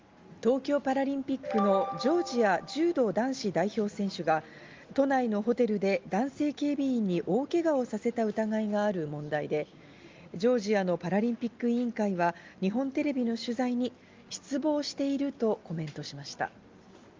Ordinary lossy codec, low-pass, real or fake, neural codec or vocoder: Opus, 32 kbps; 7.2 kHz; real; none